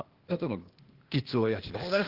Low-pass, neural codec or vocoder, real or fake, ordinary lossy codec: 5.4 kHz; codec, 16 kHz, 0.8 kbps, ZipCodec; fake; Opus, 16 kbps